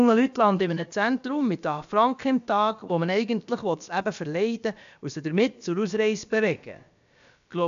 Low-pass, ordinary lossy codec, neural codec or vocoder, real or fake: 7.2 kHz; AAC, 96 kbps; codec, 16 kHz, about 1 kbps, DyCAST, with the encoder's durations; fake